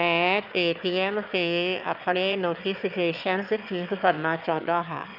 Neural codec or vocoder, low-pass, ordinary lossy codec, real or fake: autoencoder, 22.05 kHz, a latent of 192 numbers a frame, VITS, trained on one speaker; 5.4 kHz; none; fake